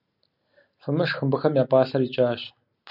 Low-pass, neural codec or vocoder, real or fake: 5.4 kHz; none; real